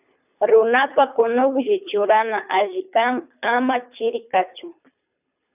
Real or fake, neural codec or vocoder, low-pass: fake; codec, 24 kHz, 3 kbps, HILCodec; 3.6 kHz